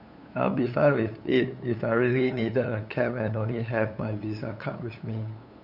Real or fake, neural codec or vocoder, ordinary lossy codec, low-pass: fake; codec, 16 kHz, 8 kbps, FunCodec, trained on LibriTTS, 25 frames a second; none; 5.4 kHz